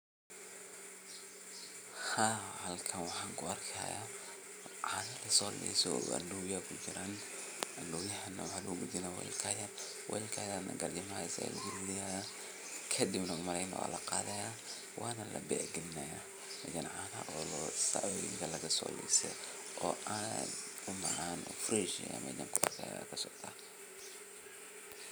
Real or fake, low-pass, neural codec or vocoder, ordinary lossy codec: real; none; none; none